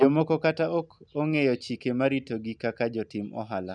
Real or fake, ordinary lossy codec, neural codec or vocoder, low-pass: real; none; none; 9.9 kHz